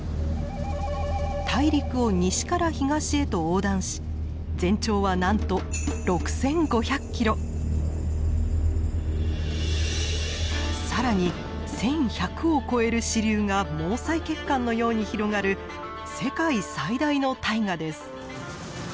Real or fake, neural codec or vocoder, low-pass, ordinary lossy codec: real; none; none; none